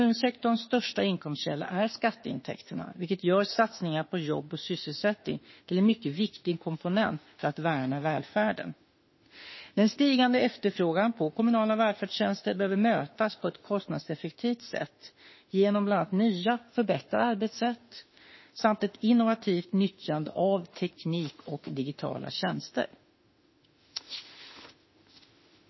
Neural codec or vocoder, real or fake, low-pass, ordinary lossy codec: autoencoder, 48 kHz, 32 numbers a frame, DAC-VAE, trained on Japanese speech; fake; 7.2 kHz; MP3, 24 kbps